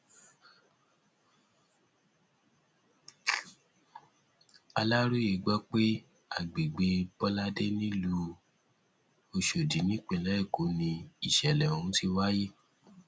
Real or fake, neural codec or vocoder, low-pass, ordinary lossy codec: real; none; none; none